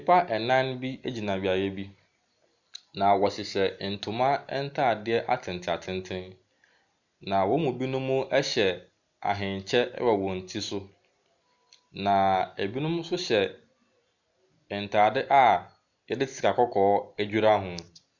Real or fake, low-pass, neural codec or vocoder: real; 7.2 kHz; none